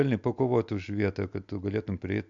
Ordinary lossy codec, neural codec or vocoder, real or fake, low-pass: MP3, 64 kbps; none; real; 7.2 kHz